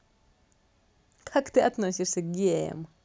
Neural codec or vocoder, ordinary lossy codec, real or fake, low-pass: none; none; real; none